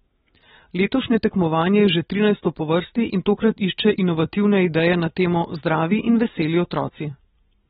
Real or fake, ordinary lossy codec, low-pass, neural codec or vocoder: real; AAC, 16 kbps; 19.8 kHz; none